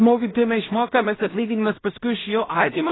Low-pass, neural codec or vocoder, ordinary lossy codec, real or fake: 7.2 kHz; codec, 16 kHz in and 24 kHz out, 0.4 kbps, LongCat-Audio-Codec, two codebook decoder; AAC, 16 kbps; fake